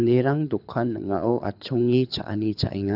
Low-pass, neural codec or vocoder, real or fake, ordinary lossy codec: 5.4 kHz; codec, 24 kHz, 6 kbps, HILCodec; fake; none